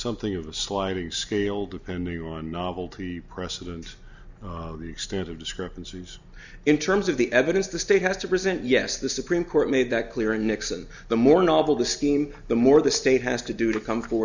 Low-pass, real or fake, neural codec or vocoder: 7.2 kHz; real; none